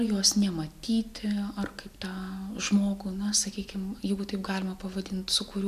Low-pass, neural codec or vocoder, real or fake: 14.4 kHz; none; real